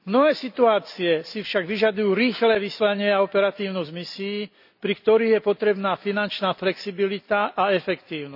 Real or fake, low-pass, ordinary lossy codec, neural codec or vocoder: real; 5.4 kHz; none; none